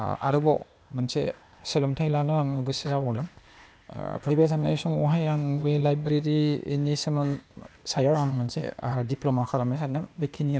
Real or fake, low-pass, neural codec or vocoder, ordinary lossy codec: fake; none; codec, 16 kHz, 0.8 kbps, ZipCodec; none